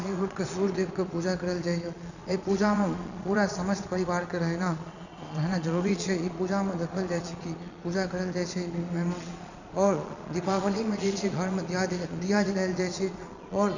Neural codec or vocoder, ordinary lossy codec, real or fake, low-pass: vocoder, 22.05 kHz, 80 mel bands, Vocos; none; fake; 7.2 kHz